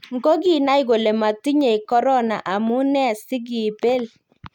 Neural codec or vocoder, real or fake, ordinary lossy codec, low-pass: none; real; none; 19.8 kHz